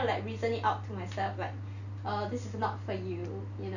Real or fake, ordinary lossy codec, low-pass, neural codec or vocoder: real; none; 7.2 kHz; none